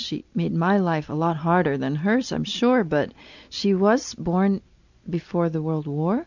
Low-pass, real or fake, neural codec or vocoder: 7.2 kHz; real; none